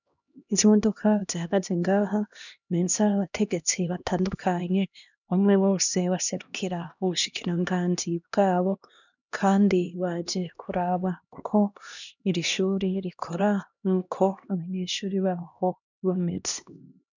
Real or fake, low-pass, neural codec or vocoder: fake; 7.2 kHz; codec, 16 kHz, 1 kbps, X-Codec, HuBERT features, trained on LibriSpeech